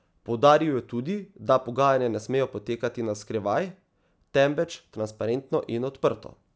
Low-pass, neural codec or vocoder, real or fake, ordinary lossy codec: none; none; real; none